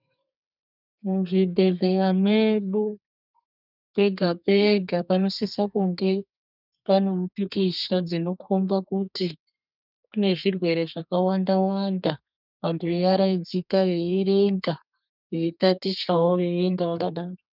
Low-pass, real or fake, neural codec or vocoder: 5.4 kHz; fake; codec, 32 kHz, 1.9 kbps, SNAC